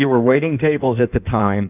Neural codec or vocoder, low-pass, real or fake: codec, 16 kHz in and 24 kHz out, 1.1 kbps, FireRedTTS-2 codec; 3.6 kHz; fake